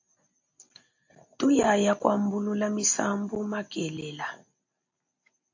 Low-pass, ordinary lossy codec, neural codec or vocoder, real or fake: 7.2 kHz; AAC, 48 kbps; none; real